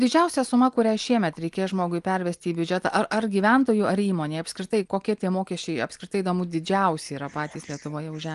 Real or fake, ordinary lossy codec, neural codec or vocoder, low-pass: real; Opus, 32 kbps; none; 10.8 kHz